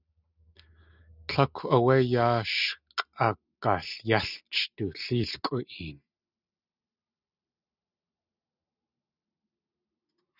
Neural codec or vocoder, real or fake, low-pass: none; real; 5.4 kHz